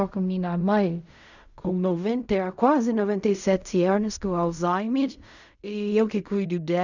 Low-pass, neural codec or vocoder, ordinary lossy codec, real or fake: 7.2 kHz; codec, 16 kHz in and 24 kHz out, 0.4 kbps, LongCat-Audio-Codec, fine tuned four codebook decoder; none; fake